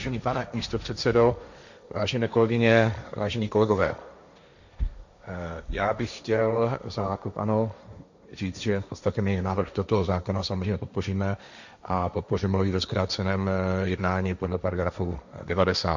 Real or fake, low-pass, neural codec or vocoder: fake; 7.2 kHz; codec, 16 kHz, 1.1 kbps, Voila-Tokenizer